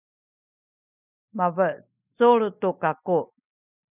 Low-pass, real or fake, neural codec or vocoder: 3.6 kHz; real; none